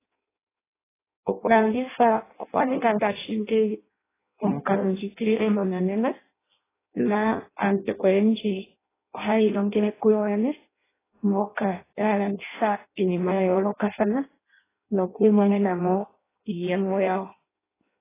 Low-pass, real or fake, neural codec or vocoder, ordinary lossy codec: 3.6 kHz; fake; codec, 16 kHz in and 24 kHz out, 0.6 kbps, FireRedTTS-2 codec; AAC, 16 kbps